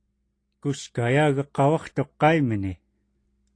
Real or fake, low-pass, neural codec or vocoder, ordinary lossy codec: real; 9.9 kHz; none; AAC, 48 kbps